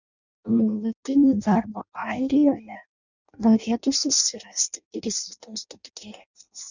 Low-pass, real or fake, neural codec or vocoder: 7.2 kHz; fake; codec, 16 kHz in and 24 kHz out, 0.6 kbps, FireRedTTS-2 codec